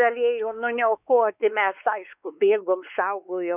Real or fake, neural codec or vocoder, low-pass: fake; codec, 16 kHz, 4 kbps, X-Codec, WavLM features, trained on Multilingual LibriSpeech; 3.6 kHz